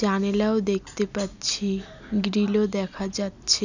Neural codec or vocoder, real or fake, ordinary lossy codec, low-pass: none; real; none; 7.2 kHz